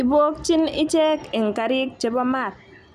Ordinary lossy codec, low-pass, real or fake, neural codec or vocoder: none; 14.4 kHz; real; none